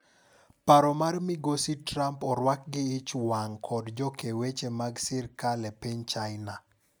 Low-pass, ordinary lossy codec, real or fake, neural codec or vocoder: none; none; real; none